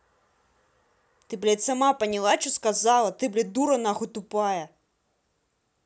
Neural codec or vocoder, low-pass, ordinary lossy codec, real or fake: none; none; none; real